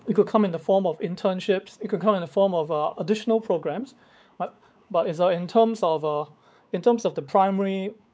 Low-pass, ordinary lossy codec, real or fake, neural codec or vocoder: none; none; fake; codec, 16 kHz, 4 kbps, X-Codec, WavLM features, trained on Multilingual LibriSpeech